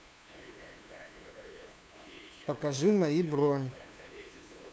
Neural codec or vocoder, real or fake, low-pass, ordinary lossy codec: codec, 16 kHz, 2 kbps, FunCodec, trained on LibriTTS, 25 frames a second; fake; none; none